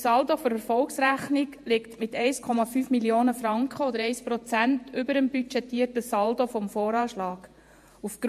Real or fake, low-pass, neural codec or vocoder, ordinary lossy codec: fake; 14.4 kHz; vocoder, 48 kHz, 128 mel bands, Vocos; MP3, 64 kbps